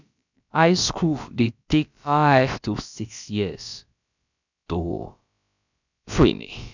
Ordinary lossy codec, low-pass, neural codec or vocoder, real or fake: none; 7.2 kHz; codec, 16 kHz, about 1 kbps, DyCAST, with the encoder's durations; fake